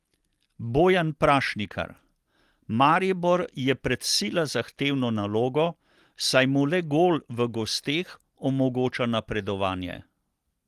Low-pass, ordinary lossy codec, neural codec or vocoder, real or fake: 14.4 kHz; Opus, 24 kbps; none; real